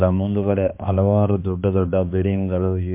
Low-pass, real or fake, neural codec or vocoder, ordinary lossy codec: 3.6 kHz; fake; codec, 16 kHz, 2 kbps, X-Codec, HuBERT features, trained on balanced general audio; MP3, 32 kbps